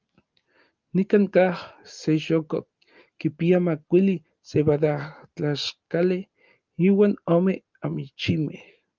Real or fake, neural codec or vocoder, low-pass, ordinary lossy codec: real; none; 7.2 kHz; Opus, 24 kbps